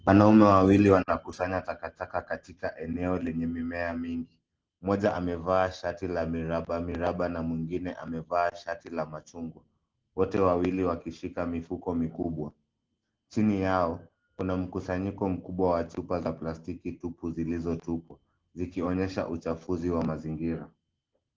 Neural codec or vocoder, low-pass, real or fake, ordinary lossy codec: none; 7.2 kHz; real; Opus, 16 kbps